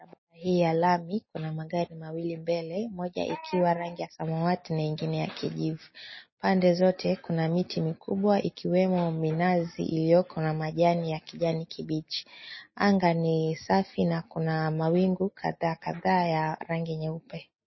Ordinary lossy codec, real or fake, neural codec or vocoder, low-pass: MP3, 24 kbps; real; none; 7.2 kHz